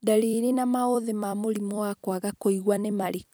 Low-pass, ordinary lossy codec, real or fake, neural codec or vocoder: none; none; fake; vocoder, 44.1 kHz, 128 mel bands every 256 samples, BigVGAN v2